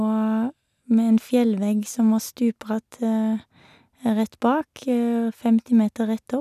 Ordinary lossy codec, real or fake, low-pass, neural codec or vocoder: none; real; 14.4 kHz; none